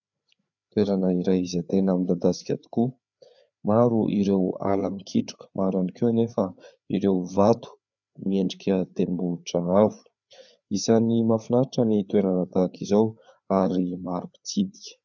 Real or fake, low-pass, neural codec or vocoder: fake; 7.2 kHz; codec, 16 kHz, 4 kbps, FreqCodec, larger model